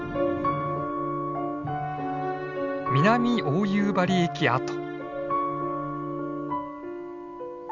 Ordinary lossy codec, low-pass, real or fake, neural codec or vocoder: none; 7.2 kHz; real; none